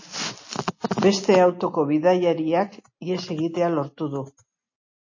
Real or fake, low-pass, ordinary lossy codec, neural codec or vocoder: real; 7.2 kHz; MP3, 32 kbps; none